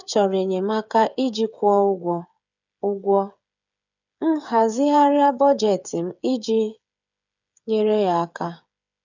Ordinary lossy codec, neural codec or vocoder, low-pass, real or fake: none; codec, 16 kHz, 8 kbps, FreqCodec, smaller model; 7.2 kHz; fake